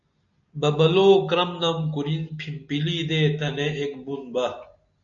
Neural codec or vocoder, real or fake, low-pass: none; real; 7.2 kHz